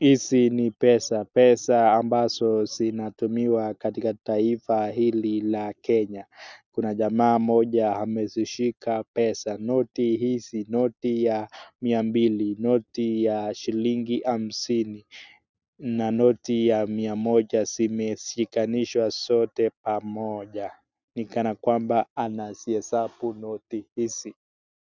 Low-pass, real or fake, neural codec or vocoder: 7.2 kHz; real; none